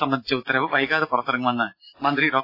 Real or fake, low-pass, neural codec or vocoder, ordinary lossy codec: real; 5.4 kHz; none; AAC, 32 kbps